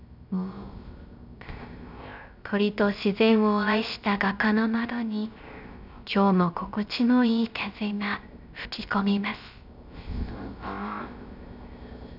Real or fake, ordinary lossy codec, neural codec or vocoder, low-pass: fake; none; codec, 16 kHz, 0.3 kbps, FocalCodec; 5.4 kHz